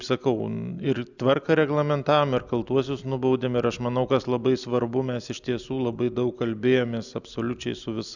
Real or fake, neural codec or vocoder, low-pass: real; none; 7.2 kHz